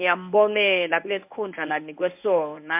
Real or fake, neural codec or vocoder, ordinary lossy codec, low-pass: fake; codec, 24 kHz, 0.9 kbps, WavTokenizer, medium speech release version 1; MP3, 32 kbps; 3.6 kHz